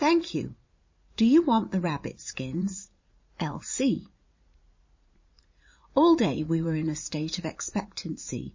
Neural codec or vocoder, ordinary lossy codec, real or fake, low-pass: vocoder, 44.1 kHz, 80 mel bands, Vocos; MP3, 32 kbps; fake; 7.2 kHz